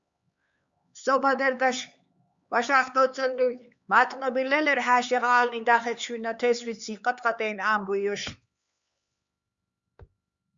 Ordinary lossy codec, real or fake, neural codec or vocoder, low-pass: Opus, 64 kbps; fake; codec, 16 kHz, 4 kbps, X-Codec, HuBERT features, trained on LibriSpeech; 7.2 kHz